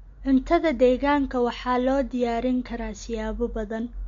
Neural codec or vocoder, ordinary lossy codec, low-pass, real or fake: none; MP3, 48 kbps; 7.2 kHz; real